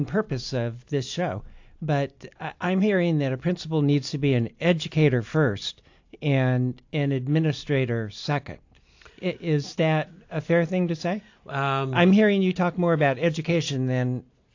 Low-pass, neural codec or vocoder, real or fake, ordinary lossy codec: 7.2 kHz; none; real; AAC, 48 kbps